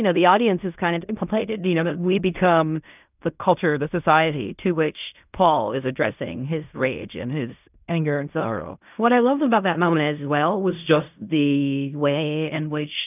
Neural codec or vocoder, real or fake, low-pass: codec, 16 kHz in and 24 kHz out, 0.4 kbps, LongCat-Audio-Codec, fine tuned four codebook decoder; fake; 3.6 kHz